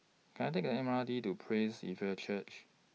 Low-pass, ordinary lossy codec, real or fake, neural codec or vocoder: none; none; real; none